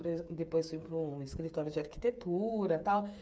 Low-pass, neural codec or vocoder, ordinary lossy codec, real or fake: none; codec, 16 kHz, 8 kbps, FreqCodec, smaller model; none; fake